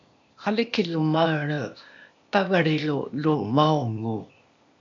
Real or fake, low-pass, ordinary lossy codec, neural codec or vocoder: fake; 7.2 kHz; MP3, 64 kbps; codec, 16 kHz, 0.8 kbps, ZipCodec